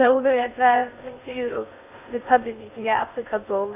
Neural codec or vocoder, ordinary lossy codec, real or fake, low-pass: codec, 16 kHz in and 24 kHz out, 0.6 kbps, FocalCodec, streaming, 2048 codes; none; fake; 3.6 kHz